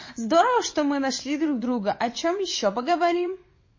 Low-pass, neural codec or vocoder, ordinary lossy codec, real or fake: 7.2 kHz; vocoder, 22.05 kHz, 80 mel bands, WaveNeXt; MP3, 32 kbps; fake